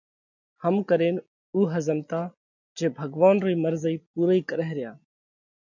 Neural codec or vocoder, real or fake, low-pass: none; real; 7.2 kHz